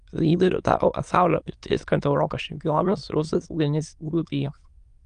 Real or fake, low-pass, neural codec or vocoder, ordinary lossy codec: fake; 9.9 kHz; autoencoder, 22.05 kHz, a latent of 192 numbers a frame, VITS, trained on many speakers; Opus, 32 kbps